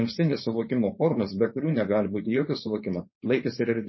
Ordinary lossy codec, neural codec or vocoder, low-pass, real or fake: MP3, 24 kbps; codec, 16 kHz, 4.8 kbps, FACodec; 7.2 kHz; fake